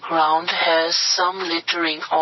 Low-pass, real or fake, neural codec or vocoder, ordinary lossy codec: 7.2 kHz; real; none; MP3, 24 kbps